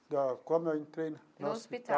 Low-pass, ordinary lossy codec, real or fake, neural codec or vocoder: none; none; real; none